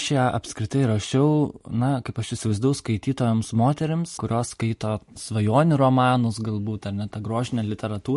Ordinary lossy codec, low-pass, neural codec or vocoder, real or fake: MP3, 48 kbps; 14.4 kHz; none; real